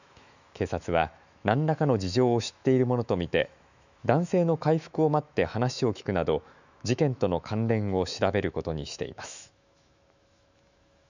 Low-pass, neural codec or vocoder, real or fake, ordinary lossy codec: 7.2 kHz; autoencoder, 48 kHz, 128 numbers a frame, DAC-VAE, trained on Japanese speech; fake; none